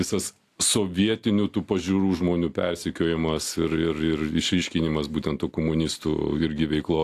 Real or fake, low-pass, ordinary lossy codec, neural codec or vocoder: real; 14.4 kHz; AAC, 64 kbps; none